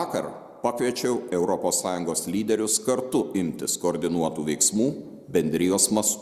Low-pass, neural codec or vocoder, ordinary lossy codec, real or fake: 14.4 kHz; none; Opus, 64 kbps; real